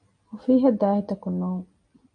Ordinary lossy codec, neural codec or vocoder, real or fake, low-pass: MP3, 48 kbps; none; real; 9.9 kHz